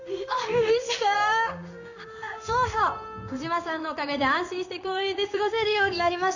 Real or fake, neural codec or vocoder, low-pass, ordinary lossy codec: fake; codec, 16 kHz in and 24 kHz out, 1 kbps, XY-Tokenizer; 7.2 kHz; none